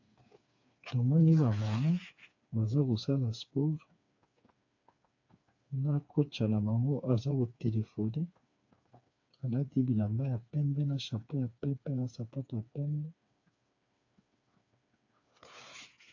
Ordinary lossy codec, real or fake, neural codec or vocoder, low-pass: AAC, 48 kbps; fake; codec, 16 kHz, 4 kbps, FreqCodec, smaller model; 7.2 kHz